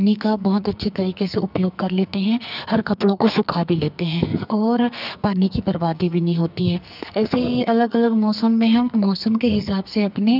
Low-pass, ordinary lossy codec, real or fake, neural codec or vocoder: 5.4 kHz; none; fake; codec, 44.1 kHz, 2.6 kbps, SNAC